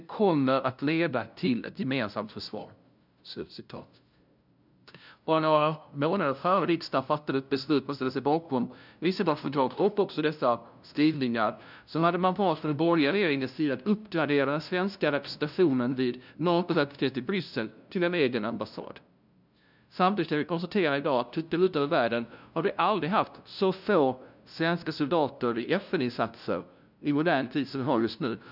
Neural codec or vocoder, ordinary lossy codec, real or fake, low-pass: codec, 16 kHz, 0.5 kbps, FunCodec, trained on LibriTTS, 25 frames a second; none; fake; 5.4 kHz